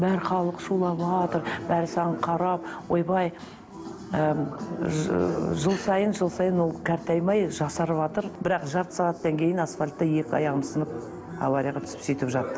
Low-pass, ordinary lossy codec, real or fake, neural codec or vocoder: none; none; real; none